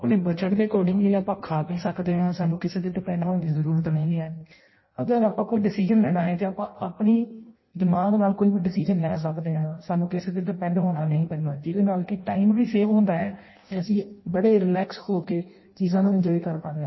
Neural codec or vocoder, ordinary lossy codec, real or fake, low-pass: codec, 16 kHz in and 24 kHz out, 0.6 kbps, FireRedTTS-2 codec; MP3, 24 kbps; fake; 7.2 kHz